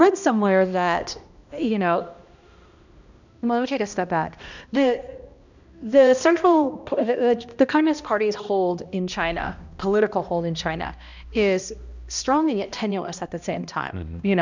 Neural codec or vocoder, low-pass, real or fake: codec, 16 kHz, 1 kbps, X-Codec, HuBERT features, trained on balanced general audio; 7.2 kHz; fake